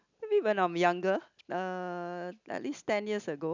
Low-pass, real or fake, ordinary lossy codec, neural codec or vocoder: 7.2 kHz; real; none; none